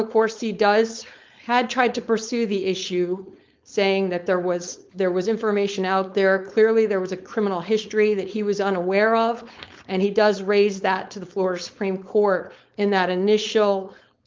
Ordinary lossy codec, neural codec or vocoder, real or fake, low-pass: Opus, 24 kbps; codec, 16 kHz, 4.8 kbps, FACodec; fake; 7.2 kHz